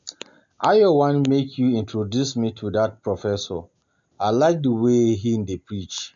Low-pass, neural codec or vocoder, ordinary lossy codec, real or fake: 7.2 kHz; none; MP3, 64 kbps; real